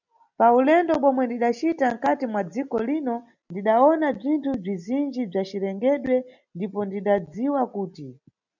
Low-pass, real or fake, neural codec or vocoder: 7.2 kHz; real; none